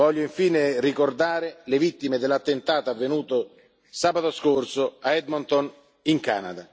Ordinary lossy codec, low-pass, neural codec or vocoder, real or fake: none; none; none; real